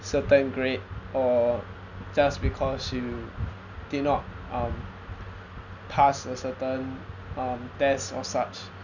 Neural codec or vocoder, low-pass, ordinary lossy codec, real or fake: none; 7.2 kHz; none; real